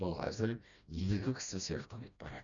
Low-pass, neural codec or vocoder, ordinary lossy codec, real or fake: 7.2 kHz; codec, 16 kHz, 1 kbps, FreqCodec, smaller model; none; fake